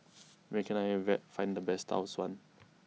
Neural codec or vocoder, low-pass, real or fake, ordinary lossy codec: none; none; real; none